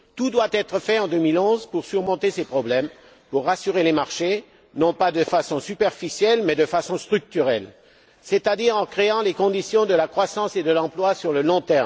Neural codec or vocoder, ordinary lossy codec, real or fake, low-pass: none; none; real; none